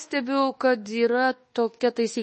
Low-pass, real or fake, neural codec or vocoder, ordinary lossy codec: 10.8 kHz; fake; codec, 24 kHz, 1.2 kbps, DualCodec; MP3, 32 kbps